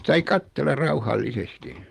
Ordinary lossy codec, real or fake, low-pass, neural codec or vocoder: Opus, 32 kbps; fake; 14.4 kHz; vocoder, 44.1 kHz, 128 mel bands every 256 samples, BigVGAN v2